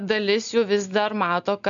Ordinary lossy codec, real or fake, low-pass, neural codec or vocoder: MP3, 96 kbps; real; 7.2 kHz; none